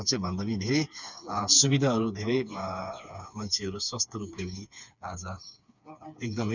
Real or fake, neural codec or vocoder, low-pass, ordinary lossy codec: fake; codec, 16 kHz, 4 kbps, FreqCodec, smaller model; 7.2 kHz; none